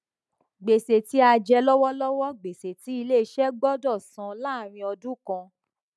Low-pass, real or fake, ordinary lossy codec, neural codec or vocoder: none; real; none; none